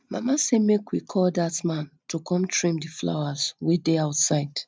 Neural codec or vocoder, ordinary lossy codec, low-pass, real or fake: none; none; none; real